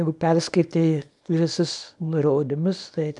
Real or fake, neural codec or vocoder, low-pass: fake; codec, 24 kHz, 0.9 kbps, WavTokenizer, small release; 10.8 kHz